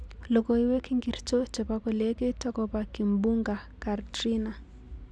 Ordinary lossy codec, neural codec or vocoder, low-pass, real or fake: none; none; none; real